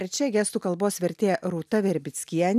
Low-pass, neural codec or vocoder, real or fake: 14.4 kHz; none; real